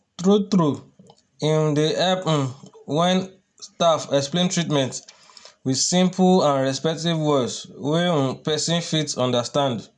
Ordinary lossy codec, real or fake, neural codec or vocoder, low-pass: none; real; none; 10.8 kHz